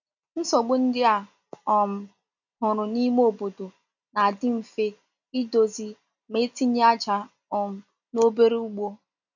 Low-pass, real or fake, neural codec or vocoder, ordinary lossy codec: 7.2 kHz; real; none; none